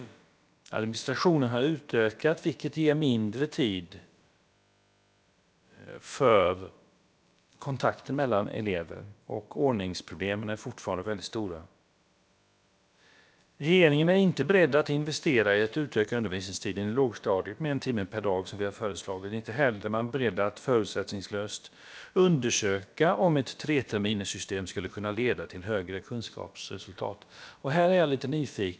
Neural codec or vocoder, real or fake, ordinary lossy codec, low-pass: codec, 16 kHz, about 1 kbps, DyCAST, with the encoder's durations; fake; none; none